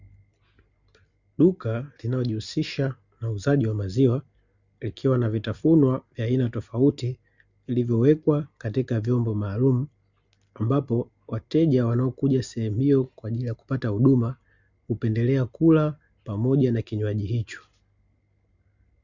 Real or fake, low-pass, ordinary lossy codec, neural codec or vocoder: real; 7.2 kHz; Opus, 64 kbps; none